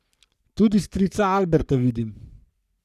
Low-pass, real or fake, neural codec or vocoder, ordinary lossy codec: 14.4 kHz; fake; codec, 44.1 kHz, 3.4 kbps, Pupu-Codec; none